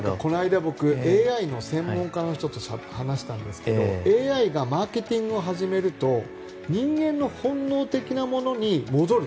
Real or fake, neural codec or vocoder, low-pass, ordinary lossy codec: real; none; none; none